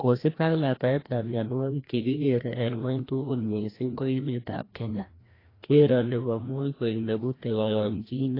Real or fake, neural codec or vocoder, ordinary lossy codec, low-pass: fake; codec, 16 kHz, 1 kbps, FreqCodec, larger model; AAC, 24 kbps; 5.4 kHz